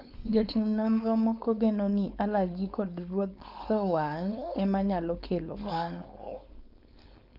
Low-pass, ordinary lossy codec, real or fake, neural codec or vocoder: 5.4 kHz; none; fake; codec, 16 kHz, 4.8 kbps, FACodec